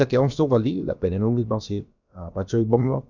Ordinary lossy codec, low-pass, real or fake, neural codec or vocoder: none; 7.2 kHz; fake; codec, 16 kHz, about 1 kbps, DyCAST, with the encoder's durations